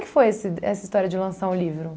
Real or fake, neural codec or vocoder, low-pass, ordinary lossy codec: real; none; none; none